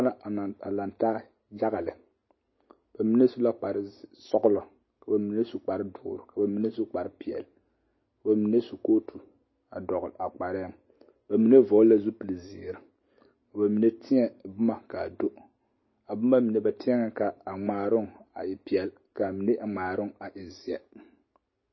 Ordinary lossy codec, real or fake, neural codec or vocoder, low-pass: MP3, 24 kbps; real; none; 7.2 kHz